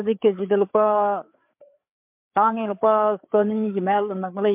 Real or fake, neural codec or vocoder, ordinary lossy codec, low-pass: fake; codec, 16 kHz, 4 kbps, FreqCodec, larger model; MP3, 32 kbps; 3.6 kHz